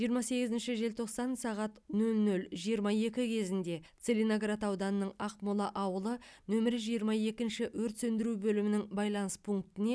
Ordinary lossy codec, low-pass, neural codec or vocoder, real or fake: none; none; none; real